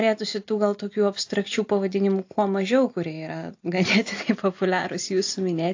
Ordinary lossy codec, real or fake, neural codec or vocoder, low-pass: AAC, 48 kbps; real; none; 7.2 kHz